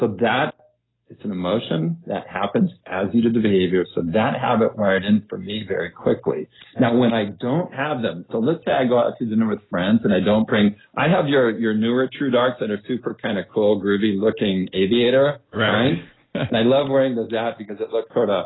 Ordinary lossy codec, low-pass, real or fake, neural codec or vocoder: AAC, 16 kbps; 7.2 kHz; real; none